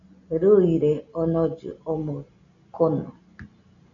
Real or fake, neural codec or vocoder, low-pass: real; none; 7.2 kHz